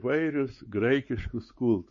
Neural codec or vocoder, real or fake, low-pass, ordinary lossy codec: codec, 16 kHz, 4 kbps, X-Codec, WavLM features, trained on Multilingual LibriSpeech; fake; 7.2 kHz; MP3, 32 kbps